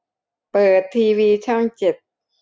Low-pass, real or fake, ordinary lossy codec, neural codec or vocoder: none; real; none; none